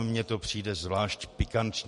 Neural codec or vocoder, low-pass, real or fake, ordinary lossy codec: vocoder, 44.1 kHz, 128 mel bands every 512 samples, BigVGAN v2; 14.4 kHz; fake; MP3, 48 kbps